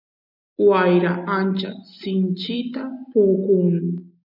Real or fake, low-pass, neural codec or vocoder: real; 5.4 kHz; none